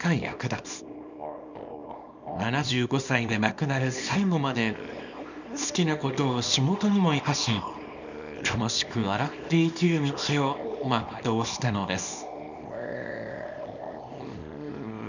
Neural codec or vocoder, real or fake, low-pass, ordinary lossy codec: codec, 24 kHz, 0.9 kbps, WavTokenizer, small release; fake; 7.2 kHz; none